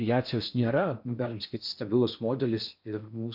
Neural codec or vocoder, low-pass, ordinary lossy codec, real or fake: codec, 16 kHz in and 24 kHz out, 0.6 kbps, FocalCodec, streaming, 4096 codes; 5.4 kHz; MP3, 48 kbps; fake